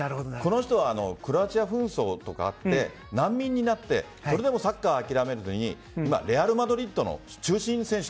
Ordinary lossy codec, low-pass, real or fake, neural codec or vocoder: none; none; real; none